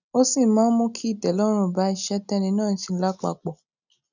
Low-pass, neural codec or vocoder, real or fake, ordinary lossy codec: 7.2 kHz; none; real; none